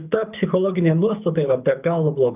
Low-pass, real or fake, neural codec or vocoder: 3.6 kHz; fake; codec, 24 kHz, 6 kbps, HILCodec